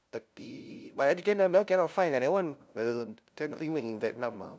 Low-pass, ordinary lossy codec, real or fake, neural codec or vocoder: none; none; fake; codec, 16 kHz, 0.5 kbps, FunCodec, trained on LibriTTS, 25 frames a second